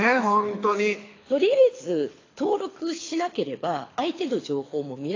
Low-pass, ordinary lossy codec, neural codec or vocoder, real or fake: 7.2 kHz; AAC, 32 kbps; codec, 24 kHz, 6 kbps, HILCodec; fake